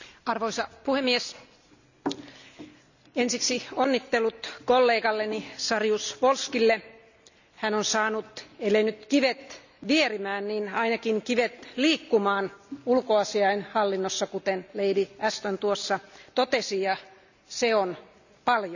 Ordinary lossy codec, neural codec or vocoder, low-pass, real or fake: none; none; 7.2 kHz; real